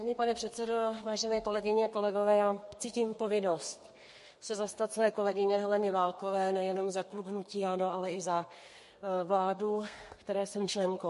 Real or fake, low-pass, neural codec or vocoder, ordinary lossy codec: fake; 14.4 kHz; codec, 32 kHz, 1.9 kbps, SNAC; MP3, 48 kbps